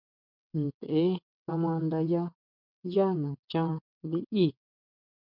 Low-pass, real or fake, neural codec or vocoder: 5.4 kHz; fake; vocoder, 22.05 kHz, 80 mel bands, WaveNeXt